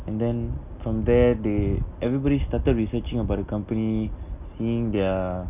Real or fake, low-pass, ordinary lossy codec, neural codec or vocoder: real; 3.6 kHz; none; none